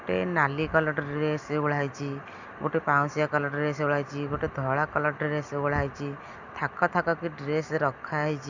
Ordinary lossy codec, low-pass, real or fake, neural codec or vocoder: Opus, 64 kbps; 7.2 kHz; real; none